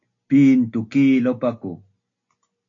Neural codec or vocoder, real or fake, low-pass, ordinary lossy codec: none; real; 7.2 kHz; AAC, 48 kbps